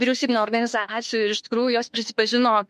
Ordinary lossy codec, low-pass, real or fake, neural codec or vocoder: MP3, 64 kbps; 14.4 kHz; fake; autoencoder, 48 kHz, 32 numbers a frame, DAC-VAE, trained on Japanese speech